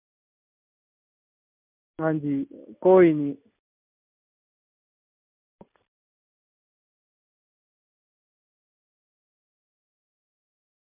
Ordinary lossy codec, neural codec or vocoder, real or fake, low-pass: MP3, 32 kbps; none; real; 3.6 kHz